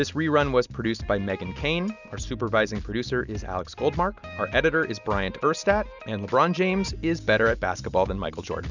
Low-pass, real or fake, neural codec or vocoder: 7.2 kHz; real; none